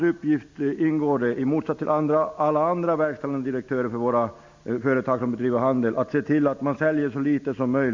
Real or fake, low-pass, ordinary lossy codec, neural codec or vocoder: real; 7.2 kHz; MP3, 64 kbps; none